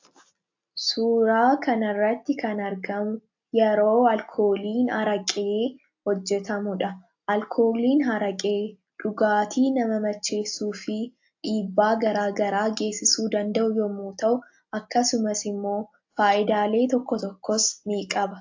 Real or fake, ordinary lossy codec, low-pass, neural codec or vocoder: real; AAC, 48 kbps; 7.2 kHz; none